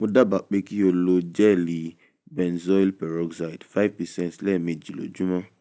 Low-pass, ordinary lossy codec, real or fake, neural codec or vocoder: none; none; real; none